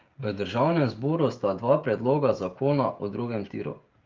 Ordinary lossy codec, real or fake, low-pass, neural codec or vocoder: Opus, 32 kbps; fake; 7.2 kHz; codec, 16 kHz, 16 kbps, FreqCodec, smaller model